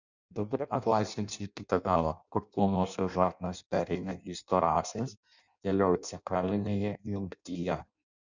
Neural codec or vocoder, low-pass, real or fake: codec, 16 kHz in and 24 kHz out, 0.6 kbps, FireRedTTS-2 codec; 7.2 kHz; fake